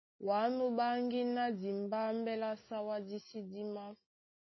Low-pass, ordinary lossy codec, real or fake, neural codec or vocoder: 7.2 kHz; MP3, 24 kbps; real; none